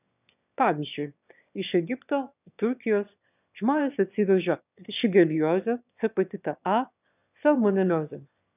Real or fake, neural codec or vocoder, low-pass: fake; autoencoder, 22.05 kHz, a latent of 192 numbers a frame, VITS, trained on one speaker; 3.6 kHz